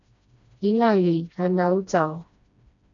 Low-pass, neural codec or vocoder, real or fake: 7.2 kHz; codec, 16 kHz, 1 kbps, FreqCodec, smaller model; fake